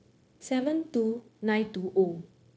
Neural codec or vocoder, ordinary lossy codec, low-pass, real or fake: codec, 16 kHz, 0.9 kbps, LongCat-Audio-Codec; none; none; fake